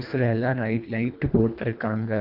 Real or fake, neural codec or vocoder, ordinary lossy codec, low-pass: fake; codec, 24 kHz, 1.5 kbps, HILCodec; none; 5.4 kHz